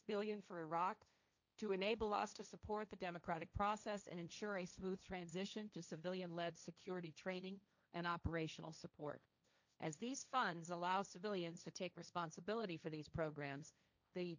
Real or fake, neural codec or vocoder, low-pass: fake; codec, 16 kHz, 1.1 kbps, Voila-Tokenizer; 7.2 kHz